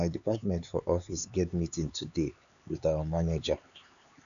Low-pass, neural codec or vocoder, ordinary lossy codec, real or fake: 7.2 kHz; codec, 16 kHz, 4 kbps, X-Codec, HuBERT features, trained on LibriSpeech; none; fake